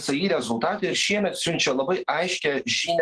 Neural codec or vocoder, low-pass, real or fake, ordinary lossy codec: none; 10.8 kHz; real; Opus, 16 kbps